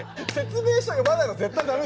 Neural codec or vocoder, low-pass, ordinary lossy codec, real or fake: none; none; none; real